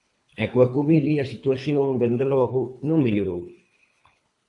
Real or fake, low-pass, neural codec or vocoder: fake; 10.8 kHz; codec, 24 kHz, 3 kbps, HILCodec